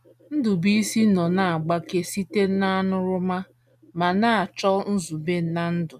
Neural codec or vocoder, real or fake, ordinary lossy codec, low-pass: none; real; none; 14.4 kHz